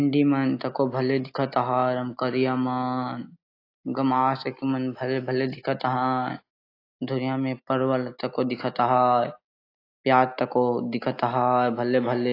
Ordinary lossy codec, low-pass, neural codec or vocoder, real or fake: AAC, 32 kbps; 5.4 kHz; none; real